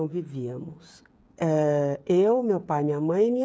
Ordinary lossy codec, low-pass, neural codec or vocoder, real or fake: none; none; codec, 16 kHz, 8 kbps, FreqCodec, smaller model; fake